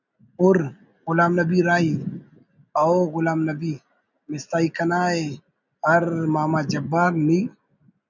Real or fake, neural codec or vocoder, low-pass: real; none; 7.2 kHz